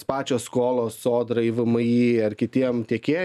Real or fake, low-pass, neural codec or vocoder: real; 14.4 kHz; none